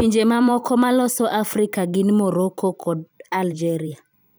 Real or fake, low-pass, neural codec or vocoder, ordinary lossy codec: fake; none; vocoder, 44.1 kHz, 128 mel bands every 512 samples, BigVGAN v2; none